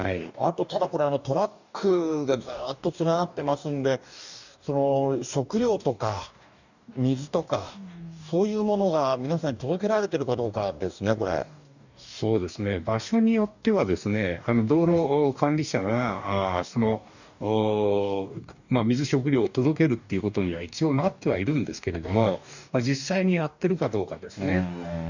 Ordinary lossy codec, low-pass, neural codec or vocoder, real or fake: none; 7.2 kHz; codec, 44.1 kHz, 2.6 kbps, DAC; fake